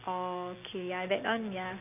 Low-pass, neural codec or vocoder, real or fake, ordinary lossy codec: 3.6 kHz; none; real; none